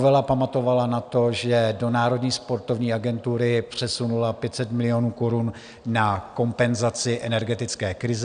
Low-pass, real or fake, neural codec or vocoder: 9.9 kHz; real; none